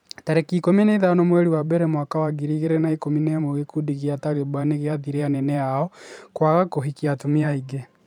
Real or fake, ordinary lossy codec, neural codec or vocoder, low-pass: fake; none; vocoder, 44.1 kHz, 128 mel bands every 512 samples, BigVGAN v2; 19.8 kHz